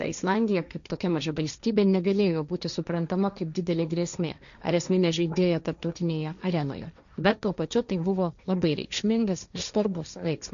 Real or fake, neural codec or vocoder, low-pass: fake; codec, 16 kHz, 1.1 kbps, Voila-Tokenizer; 7.2 kHz